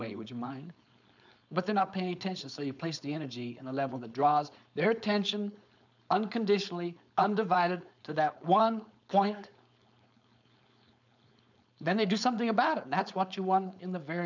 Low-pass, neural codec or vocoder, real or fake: 7.2 kHz; codec, 16 kHz, 4.8 kbps, FACodec; fake